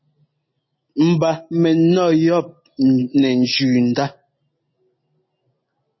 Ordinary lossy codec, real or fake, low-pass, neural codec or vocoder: MP3, 24 kbps; real; 7.2 kHz; none